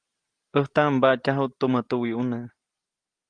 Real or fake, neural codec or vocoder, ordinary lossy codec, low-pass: real; none; Opus, 24 kbps; 9.9 kHz